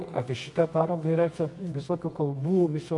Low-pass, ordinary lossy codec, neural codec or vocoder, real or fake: 10.8 kHz; MP3, 64 kbps; codec, 24 kHz, 0.9 kbps, WavTokenizer, medium music audio release; fake